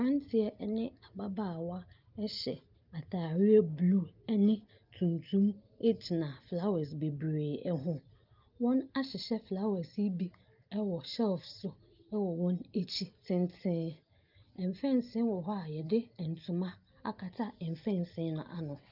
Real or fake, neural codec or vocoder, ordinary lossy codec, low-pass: real; none; Opus, 24 kbps; 5.4 kHz